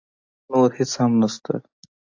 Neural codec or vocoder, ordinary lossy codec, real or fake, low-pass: none; AAC, 48 kbps; real; 7.2 kHz